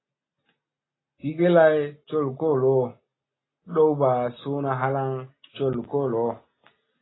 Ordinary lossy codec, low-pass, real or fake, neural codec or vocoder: AAC, 16 kbps; 7.2 kHz; real; none